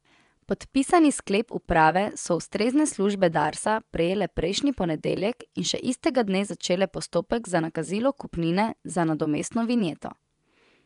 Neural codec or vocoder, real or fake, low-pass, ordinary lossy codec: vocoder, 24 kHz, 100 mel bands, Vocos; fake; 10.8 kHz; none